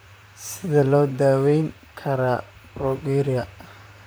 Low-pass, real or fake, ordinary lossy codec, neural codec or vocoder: none; fake; none; vocoder, 44.1 kHz, 128 mel bands, Pupu-Vocoder